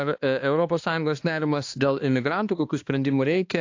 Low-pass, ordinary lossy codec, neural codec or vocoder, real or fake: 7.2 kHz; AAC, 48 kbps; codec, 16 kHz, 2 kbps, X-Codec, HuBERT features, trained on balanced general audio; fake